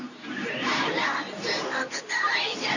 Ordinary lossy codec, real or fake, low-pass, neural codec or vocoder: none; fake; 7.2 kHz; codec, 24 kHz, 0.9 kbps, WavTokenizer, medium speech release version 2